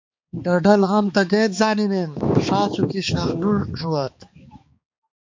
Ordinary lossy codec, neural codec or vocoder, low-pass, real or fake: MP3, 48 kbps; codec, 16 kHz, 2 kbps, X-Codec, HuBERT features, trained on balanced general audio; 7.2 kHz; fake